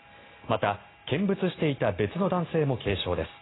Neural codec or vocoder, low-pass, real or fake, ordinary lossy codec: none; 7.2 kHz; real; AAC, 16 kbps